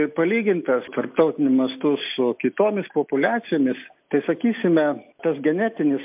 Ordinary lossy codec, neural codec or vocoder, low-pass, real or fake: MP3, 32 kbps; none; 3.6 kHz; real